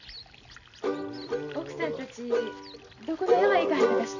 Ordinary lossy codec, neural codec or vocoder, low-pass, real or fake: none; none; 7.2 kHz; real